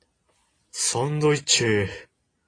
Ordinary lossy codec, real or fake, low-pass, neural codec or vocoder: AAC, 32 kbps; real; 9.9 kHz; none